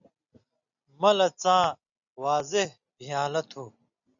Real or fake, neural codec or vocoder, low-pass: real; none; 7.2 kHz